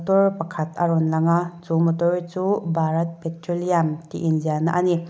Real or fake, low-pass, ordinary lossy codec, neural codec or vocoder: real; none; none; none